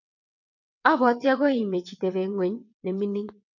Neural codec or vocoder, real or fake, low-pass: vocoder, 22.05 kHz, 80 mel bands, WaveNeXt; fake; 7.2 kHz